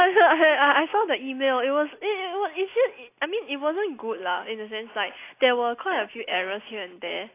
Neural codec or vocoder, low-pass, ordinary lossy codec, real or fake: none; 3.6 kHz; AAC, 24 kbps; real